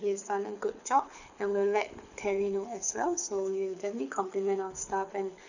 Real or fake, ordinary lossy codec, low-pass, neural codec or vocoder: fake; none; 7.2 kHz; codec, 24 kHz, 6 kbps, HILCodec